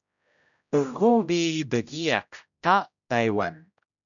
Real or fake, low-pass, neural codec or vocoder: fake; 7.2 kHz; codec, 16 kHz, 0.5 kbps, X-Codec, HuBERT features, trained on general audio